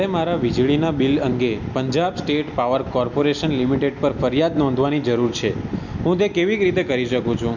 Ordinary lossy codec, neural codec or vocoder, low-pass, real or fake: none; none; 7.2 kHz; real